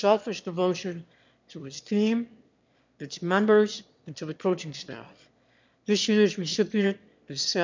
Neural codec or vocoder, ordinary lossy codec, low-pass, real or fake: autoencoder, 22.05 kHz, a latent of 192 numbers a frame, VITS, trained on one speaker; MP3, 64 kbps; 7.2 kHz; fake